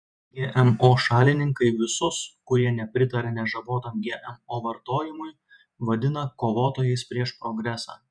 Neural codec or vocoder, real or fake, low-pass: vocoder, 48 kHz, 128 mel bands, Vocos; fake; 9.9 kHz